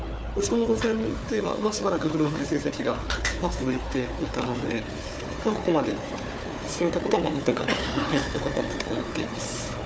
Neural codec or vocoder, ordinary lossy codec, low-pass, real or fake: codec, 16 kHz, 4 kbps, FunCodec, trained on Chinese and English, 50 frames a second; none; none; fake